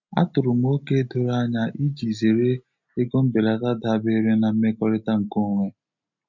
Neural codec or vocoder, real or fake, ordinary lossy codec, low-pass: none; real; none; 7.2 kHz